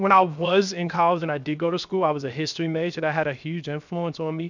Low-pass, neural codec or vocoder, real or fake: 7.2 kHz; codec, 16 kHz, 0.7 kbps, FocalCodec; fake